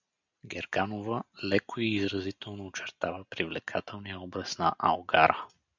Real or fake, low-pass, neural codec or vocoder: real; 7.2 kHz; none